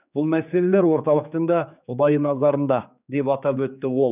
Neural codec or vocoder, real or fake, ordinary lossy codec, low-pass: codec, 16 kHz, 4 kbps, X-Codec, HuBERT features, trained on general audio; fake; none; 3.6 kHz